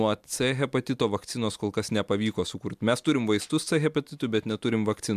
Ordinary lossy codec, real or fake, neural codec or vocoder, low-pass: MP3, 96 kbps; real; none; 14.4 kHz